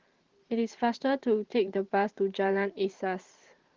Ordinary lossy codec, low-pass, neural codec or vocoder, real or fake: Opus, 16 kbps; 7.2 kHz; vocoder, 44.1 kHz, 128 mel bands every 512 samples, BigVGAN v2; fake